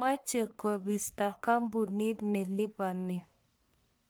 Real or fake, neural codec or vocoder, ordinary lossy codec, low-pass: fake; codec, 44.1 kHz, 1.7 kbps, Pupu-Codec; none; none